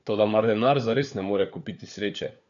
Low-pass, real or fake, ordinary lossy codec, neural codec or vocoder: 7.2 kHz; fake; none; codec, 16 kHz, 4 kbps, FunCodec, trained on LibriTTS, 50 frames a second